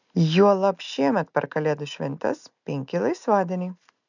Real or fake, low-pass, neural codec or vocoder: real; 7.2 kHz; none